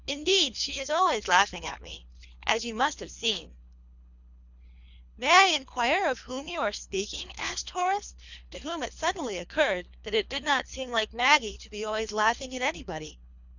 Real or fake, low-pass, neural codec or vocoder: fake; 7.2 kHz; codec, 24 kHz, 3 kbps, HILCodec